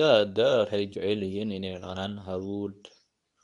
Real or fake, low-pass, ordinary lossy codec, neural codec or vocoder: fake; 10.8 kHz; none; codec, 24 kHz, 0.9 kbps, WavTokenizer, medium speech release version 2